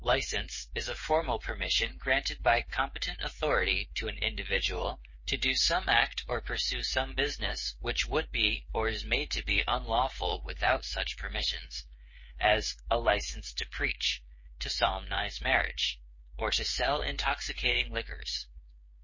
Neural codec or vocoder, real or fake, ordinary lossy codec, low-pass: codec, 16 kHz, 8 kbps, FreqCodec, smaller model; fake; MP3, 32 kbps; 7.2 kHz